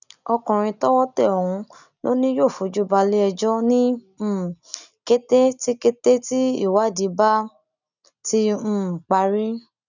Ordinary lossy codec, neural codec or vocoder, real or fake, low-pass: none; none; real; 7.2 kHz